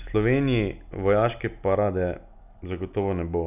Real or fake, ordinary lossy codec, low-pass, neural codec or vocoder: real; none; 3.6 kHz; none